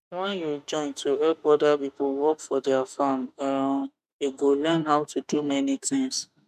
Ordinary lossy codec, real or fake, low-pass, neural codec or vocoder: none; fake; 14.4 kHz; autoencoder, 48 kHz, 32 numbers a frame, DAC-VAE, trained on Japanese speech